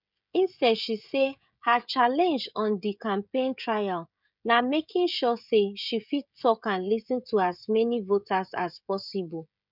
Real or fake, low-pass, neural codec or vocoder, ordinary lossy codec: fake; 5.4 kHz; codec, 16 kHz, 16 kbps, FreqCodec, smaller model; none